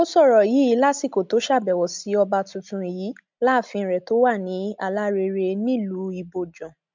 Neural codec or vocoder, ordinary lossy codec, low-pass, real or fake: none; MP3, 64 kbps; 7.2 kHz; real